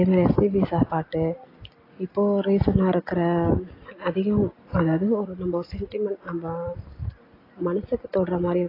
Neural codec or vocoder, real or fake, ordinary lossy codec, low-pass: none; real; AAC, 24 kbps; 5.4 kHz